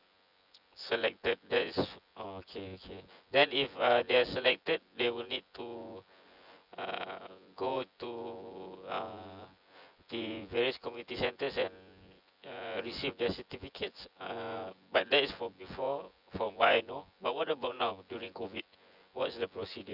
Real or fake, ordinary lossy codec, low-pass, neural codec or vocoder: fake; none; 5.4 kHz; vocoder, 24 kHz, 100 mel bands, Vocos